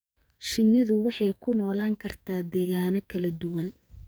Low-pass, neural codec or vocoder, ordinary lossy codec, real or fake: none; codec, 44.1 kHz, 2.6 kbps, SNAC; none; fake